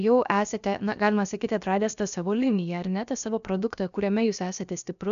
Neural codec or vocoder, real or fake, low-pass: codec, 16 kHz, about 1 kbps, DyCAST, with the encoder's durations; fake; 7.2 kHz